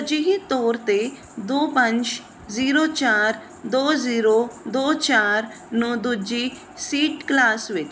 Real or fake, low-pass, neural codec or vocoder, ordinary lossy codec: real; none; none; none